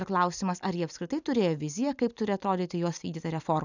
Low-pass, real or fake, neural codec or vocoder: 7.2 kHz; real; none